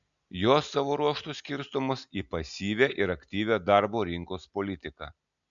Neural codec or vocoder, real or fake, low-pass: none; real; 7.2 kHz